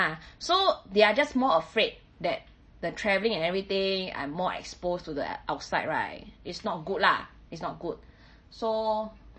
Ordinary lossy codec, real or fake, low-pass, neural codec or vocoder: MP3, 32 kbps; real; 9.9 kHz; none